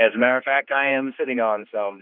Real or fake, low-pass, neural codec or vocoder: fake; 5.4 kHz; codec, 16 kHz, 2 kbps, X-Codec, HuBERT features, trained on general audio